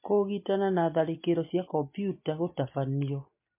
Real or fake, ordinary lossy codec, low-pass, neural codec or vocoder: real; MP3, 24 kbps; 3.6 kHz; none